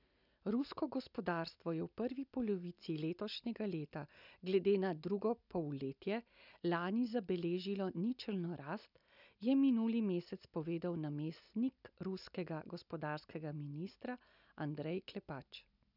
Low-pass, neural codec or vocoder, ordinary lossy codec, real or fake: 5.4 kHz; none; none; real